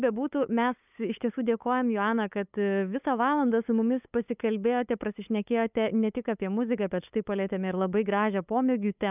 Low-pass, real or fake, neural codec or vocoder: 3.6 kHz; fake; codec, 16 kHz, 8 kbps, FunCodec, trained on LibriTTS, 25 frames a second